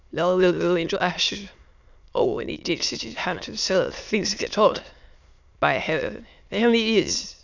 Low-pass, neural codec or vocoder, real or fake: 7.2 kHz; autoencoder, 22.05 kHz, a latent of 192 numbers a frame, VITS, trained on many speakers; fake